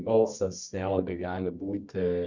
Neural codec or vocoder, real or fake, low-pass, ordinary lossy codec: codec, 24 kHz, 0.9 kbps, WavTokenizer, medium music audio release; fake; 7.2 kHz; Opus, 64 kbps